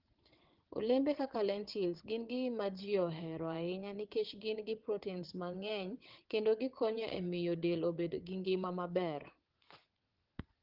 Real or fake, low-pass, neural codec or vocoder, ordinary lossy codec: fake; 5.4 kHz; vocoder, 22.05 kHz, 80 mel bands, WaveNeXt; Opus, 16 kbps